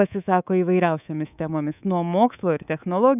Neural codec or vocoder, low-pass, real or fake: codec, 24 kHz, 3.1 kbps, DualCodec; 3.6 kHz; fake